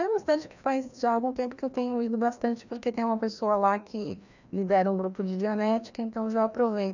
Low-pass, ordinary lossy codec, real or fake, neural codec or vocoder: 7.2 kHz; none; fake; codec, 16 kHz, 1 kbps, FreqCodec, larger model